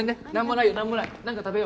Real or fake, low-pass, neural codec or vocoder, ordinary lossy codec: real; none; none; none